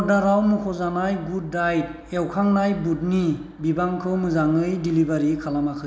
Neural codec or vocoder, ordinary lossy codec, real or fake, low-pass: none; none; real; none